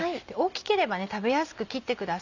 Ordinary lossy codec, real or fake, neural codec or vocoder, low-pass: none; real; none; 7.2 kHz